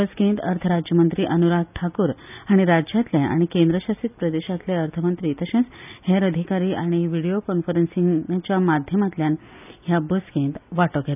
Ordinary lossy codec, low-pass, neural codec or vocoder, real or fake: none; 3.6 kHz; none; real